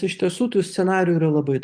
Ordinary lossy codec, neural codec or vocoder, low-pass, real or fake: Opus, 32 kbps; none; 9.9 kHz; real